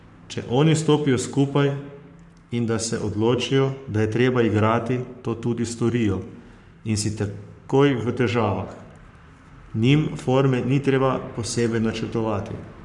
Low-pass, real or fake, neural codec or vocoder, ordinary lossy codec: 10.8 kHz; fake; codec, 44.1 kHz, 7.8 kbps, Pupu-Codec; none